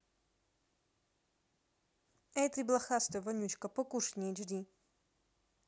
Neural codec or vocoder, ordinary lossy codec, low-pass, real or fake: none; none; none; real